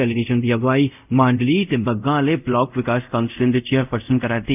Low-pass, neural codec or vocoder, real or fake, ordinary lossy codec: 3.6 kHz; codec, 24 kHz, 0.5 kbps, DualCodec; fake; none